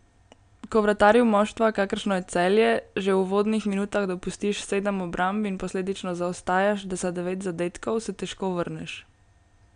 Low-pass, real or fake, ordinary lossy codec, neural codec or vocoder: 9.9 kHz; real; none; none